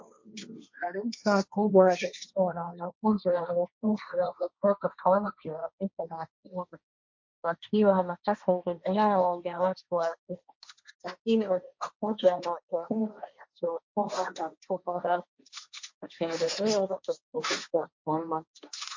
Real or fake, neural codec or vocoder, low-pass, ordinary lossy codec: fake; codec, 16 kHz, 1.1 kbps, Voila-Tokenizer; 7.2 kHz; MP3, 48 kbps